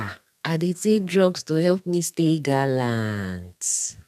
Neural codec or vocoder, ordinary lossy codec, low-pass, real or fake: codec, 32 kHz, 1.9 kbps, SNAC; none; 14.4 kHz; fake